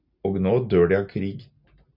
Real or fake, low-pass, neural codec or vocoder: real; 5.4 kHz; none